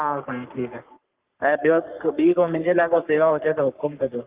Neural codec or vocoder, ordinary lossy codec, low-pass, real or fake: codec, 44.1 kHz, 3.4 kbps, Pupu-Codec; Opus, 32 kbps; 3.6 kHz; fake